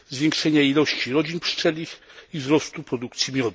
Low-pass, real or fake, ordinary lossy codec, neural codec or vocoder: none; real; none; none